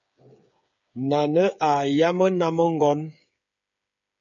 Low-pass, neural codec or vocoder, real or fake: 7.2 kHz; codec, 16 kHz, 8 kbps, FreqCodec, smaller model; fake